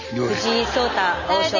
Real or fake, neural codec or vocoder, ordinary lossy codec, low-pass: real; none; none; 7.2 kHz